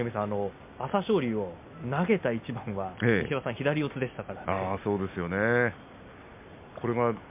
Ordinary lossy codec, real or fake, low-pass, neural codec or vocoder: MP3, 32 kbps; real; 3.6 kHz; none